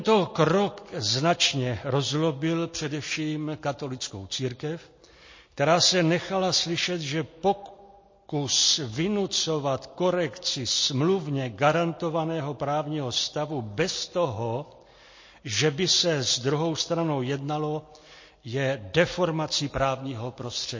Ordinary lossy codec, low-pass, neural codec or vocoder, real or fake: MP3, 32 kbps; 7.2 kHz; none; real